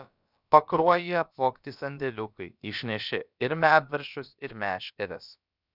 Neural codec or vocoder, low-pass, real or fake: codec, 16 kHz, about 1 kbps, DyCAST, with the encoder's durations; 5.4 kHz; fake